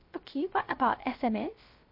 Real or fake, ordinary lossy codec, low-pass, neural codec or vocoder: fake; MP3, 32 kbps; 5.4 kHz; codec, 16 kHz, 0.3 kbps, FocalCodec